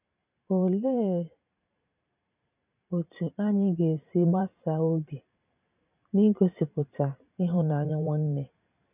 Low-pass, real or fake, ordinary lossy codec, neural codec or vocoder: 3.6 kHz; fake; none; vocoder, 44.1 kHz, 128 mel bands every 512 samples, BigVGAN v2